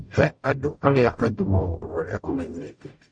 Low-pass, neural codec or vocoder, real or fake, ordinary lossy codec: 9.9 kHz; codec, 44.1 kHz, 0.9 kbps, DAC; fake; MP3, 48 kbps